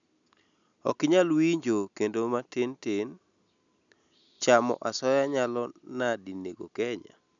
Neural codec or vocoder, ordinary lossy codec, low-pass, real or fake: none; none; 7.2 kHz; real